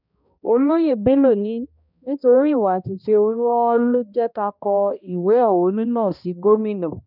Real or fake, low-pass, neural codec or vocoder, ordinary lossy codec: fake; 5.4 kHz; codec, 16 kHz, 1 kbps, X-Codec, HuBERT features, trained on balanced general audio; none